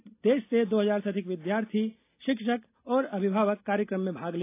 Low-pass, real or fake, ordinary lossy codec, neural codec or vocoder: 3.6 kHz; real; AAC, 24 kbps; none